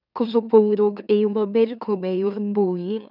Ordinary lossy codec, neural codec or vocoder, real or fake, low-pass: none; autoencoder, 44.1 kHz, a latent of 192 numbers a frame, MeloTTS; fake; 5.4 kHz